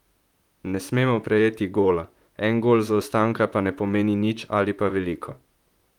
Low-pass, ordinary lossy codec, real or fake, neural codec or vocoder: 19.8 kHz; Opus, 32 kbps; fake; vocoder, 44.1 kHz, 128 mel bands, Pupu-Vocoder